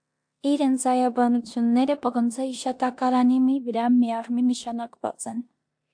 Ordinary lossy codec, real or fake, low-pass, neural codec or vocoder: AAC, 64 kbps; fake; 9.9 kHz; codec, 16 kHz in and 24 kHz out, 0.9 kbps, LongCat-Audio-Codec, four codebook decoder